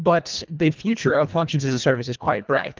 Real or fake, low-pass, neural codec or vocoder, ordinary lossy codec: fake; 7.2 kHz; codec, 24 kHz, 1.5 kbps, HILCodec; Opus, 24 kbps